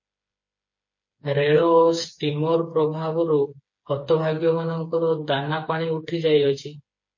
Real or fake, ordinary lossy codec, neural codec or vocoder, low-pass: fake; MP3, 32 kbps; codec, 16 kHz, 4 kbps, FreqCodec, smaller model; 7.2 kHz